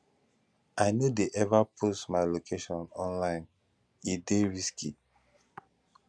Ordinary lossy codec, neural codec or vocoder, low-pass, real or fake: none; none; none; real